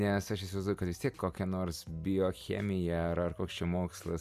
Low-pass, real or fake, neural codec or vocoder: 14.4 kHz; real; none